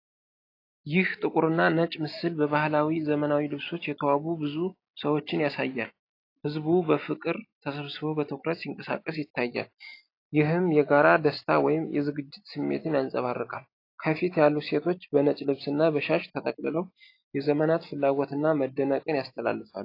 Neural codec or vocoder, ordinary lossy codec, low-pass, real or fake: none; AAC, 32 kbps; 5.4 kHz; real